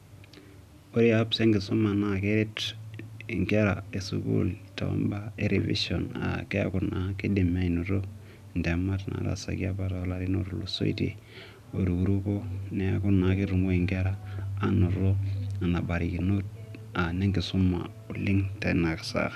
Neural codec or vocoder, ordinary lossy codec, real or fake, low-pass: none; none; real; 14.4 kHz